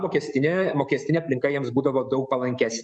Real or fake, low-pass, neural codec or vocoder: fake; 9.9 kHz; codec, 44.1 kHz, 7.8 kbps, DAC